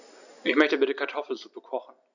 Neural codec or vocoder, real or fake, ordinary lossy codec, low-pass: none; real; none; 7.2 kHz